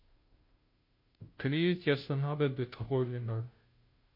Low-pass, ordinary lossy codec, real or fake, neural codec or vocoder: 5.4 kHz; MP3, 32 kbps; fake; codec, 16 kHz, 0.5 kbps, FunCodec, trained on Chinese and English, 25 frames a second